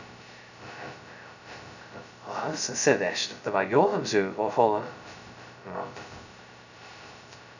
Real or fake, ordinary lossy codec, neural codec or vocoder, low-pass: fake; none; codec, 16 kHz, 0.2 kbps, FocalCodec; 7.2 kHz